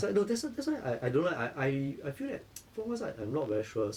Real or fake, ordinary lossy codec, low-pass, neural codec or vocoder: fake; Opus, 64 kbps; 19.8 kHz; vocoder, 44.1 kHz, 128 mel bands every 256 samples, BigVGAN v2